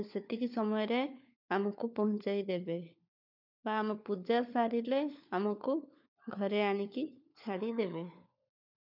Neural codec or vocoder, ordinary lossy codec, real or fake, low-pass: codec, 16 kHz, 4 kbps, FunCodec, trained on LibriTTS, 50 frames a second; none; fake; 5.4 kHz